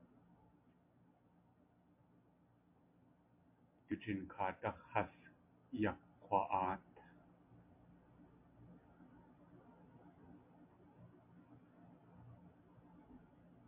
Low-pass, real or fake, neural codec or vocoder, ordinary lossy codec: 3.6 kHz; real; none; MP3, 32 kbps